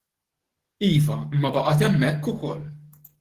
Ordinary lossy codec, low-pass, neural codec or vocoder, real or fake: Opus, 16 kbps; 14.4 kHz; codec, 44.1 kHz, 7.8 kbps, DAC; fake